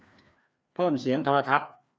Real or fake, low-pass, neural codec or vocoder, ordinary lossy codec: fake; none; codec, 16 kHz, 2 kbps, FreqCodec, larger model; none